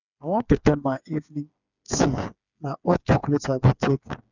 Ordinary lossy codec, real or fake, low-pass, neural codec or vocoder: none; fake; 7.2 kHz; codec, 16 kHz, 4 kbps, FreqCodec, smaller model